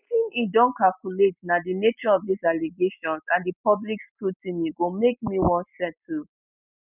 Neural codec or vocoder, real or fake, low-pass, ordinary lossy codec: none; real; 3.6 kHz; none